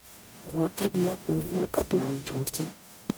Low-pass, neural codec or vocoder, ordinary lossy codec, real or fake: none; codec, 44.1 kHz, 0.9 kbps, DAC; none; fake